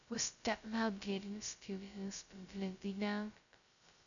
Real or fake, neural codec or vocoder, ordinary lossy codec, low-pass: fake; codec, 16 kHz, 0.2 kbps, FocalCodec; none; 7.2 kHz